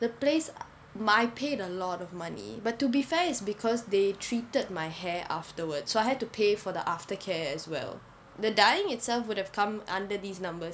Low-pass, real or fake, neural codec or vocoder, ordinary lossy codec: none; real; none; none